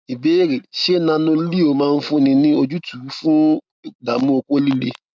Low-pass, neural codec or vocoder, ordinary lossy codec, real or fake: none; none; none; real